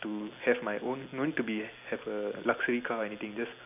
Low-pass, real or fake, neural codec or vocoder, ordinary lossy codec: 3.6 kHz; real; none; none